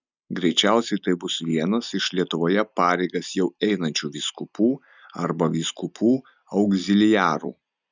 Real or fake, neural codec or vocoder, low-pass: real; none; 7.2 kHz